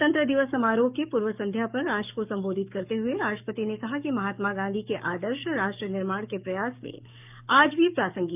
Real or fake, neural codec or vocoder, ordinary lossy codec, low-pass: fake; codec, 44.1 kHz, 7.8 kbps, DAC; none; 3.6 kHz